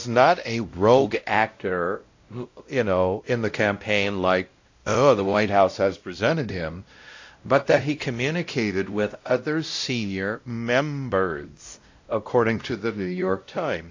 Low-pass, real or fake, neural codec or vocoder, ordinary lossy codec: 7.2 kHz; fake; codec, 16 kHz, 0.5 kbps, X-Codec, WavLM features, trained on Multilingual LibriSpeech; AAC, 48 kbps